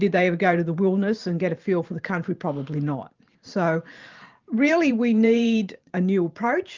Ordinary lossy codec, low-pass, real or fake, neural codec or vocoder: Opus, 24 kbps; 7.2 kHz; fake; vocoder, 44.1 kHz, 128 mel bands every 512 samples, BigVGAN v2